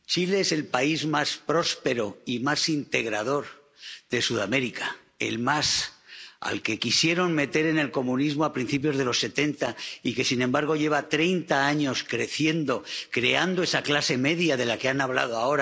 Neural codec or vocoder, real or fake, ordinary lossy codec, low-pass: none; real; none; none